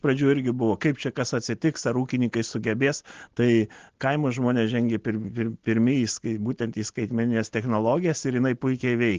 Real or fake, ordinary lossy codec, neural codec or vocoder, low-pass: real; Opus, 16 kbps; none; 7.2 kHz